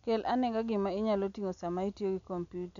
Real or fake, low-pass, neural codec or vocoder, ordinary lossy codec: real; 7.2 kHz; none; none